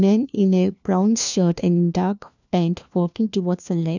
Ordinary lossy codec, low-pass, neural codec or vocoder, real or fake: none; 7.2 kHz; codec, 16 kHz, 1 kbps, FunCodec, trained on LibriTTS, 50 frames a second; fake